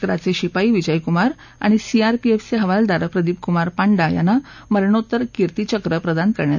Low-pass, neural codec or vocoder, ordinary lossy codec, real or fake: 7.2 kHz; none; none; real